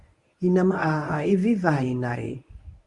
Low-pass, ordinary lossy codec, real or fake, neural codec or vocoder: 10.8 kHz; Opus, 64 kbps; fake; codec, 24 kHz, 0.9 kbps, WavTokenizer, medium speech release version 1